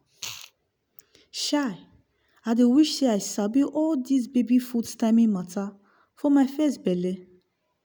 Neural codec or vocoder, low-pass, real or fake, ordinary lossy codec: none; none; real; none